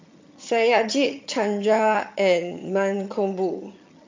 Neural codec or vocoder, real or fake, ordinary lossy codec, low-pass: vocoder, 22.05 kHz, 80 mel bands, HiFi-GAN; fake; MP3, 48 kbps; 7.2 kHz